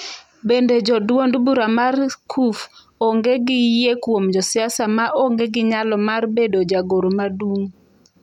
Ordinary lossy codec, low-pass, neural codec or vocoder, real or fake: none; 19.8 kHz; none; real